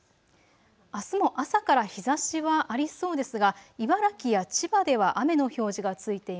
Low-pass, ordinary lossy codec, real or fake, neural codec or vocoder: none; none; real; none